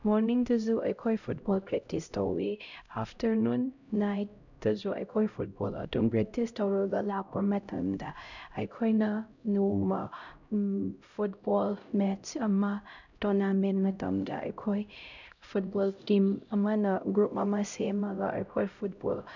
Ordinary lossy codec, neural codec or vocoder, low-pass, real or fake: none; codec, 16 kHz, 0.5 kbps, X-Codec, HuBERT features, trained on LibriSpeech; 7.2 kHz; fake